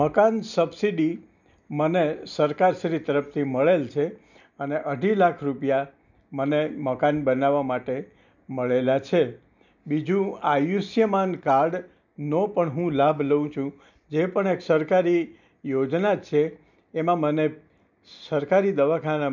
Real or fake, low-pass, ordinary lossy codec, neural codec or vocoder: real; 7.2 kHz; none; none